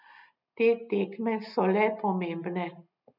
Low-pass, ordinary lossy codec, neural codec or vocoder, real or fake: 5.4 kHz; none; none; real